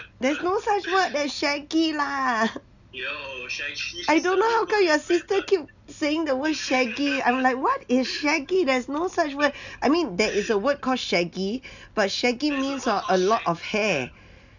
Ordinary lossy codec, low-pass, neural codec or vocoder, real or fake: none; 7.2 kHz; none; real